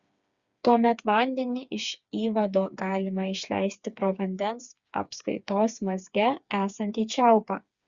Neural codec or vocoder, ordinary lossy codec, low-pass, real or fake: codec, 16 kHz, 4 kbps, FreqCodec, smaller model; Opus, 64 kbps; 7.2 kHz; fake